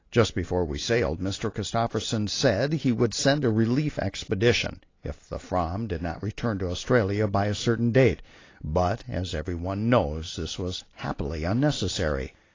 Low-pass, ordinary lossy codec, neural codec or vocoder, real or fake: 7.2 kHz; AAC, 32 kbps; none; real